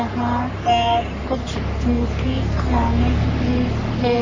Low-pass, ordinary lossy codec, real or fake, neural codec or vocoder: 7.2 kHz; MP3, 64 kbps; fake; codec, 44.1 kHz, 3.4 kbps, Pupu-Codec